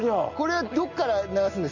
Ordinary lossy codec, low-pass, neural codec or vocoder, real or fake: Opus, 64 kbps; 7.2 kHz; none; real